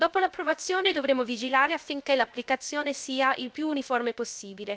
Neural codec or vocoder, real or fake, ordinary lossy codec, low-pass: codec, 16 kHz, 0.7 kbps, FocalCodec; fake; none; none